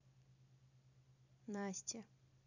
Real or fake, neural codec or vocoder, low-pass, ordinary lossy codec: fake; vocoder, 44.1 kHz, 128 mel bands every 256 samples, BigVGAN v2; 7.2 kHz; none